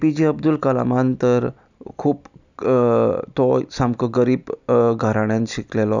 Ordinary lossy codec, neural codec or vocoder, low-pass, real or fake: none; none; 7.2 kHz; real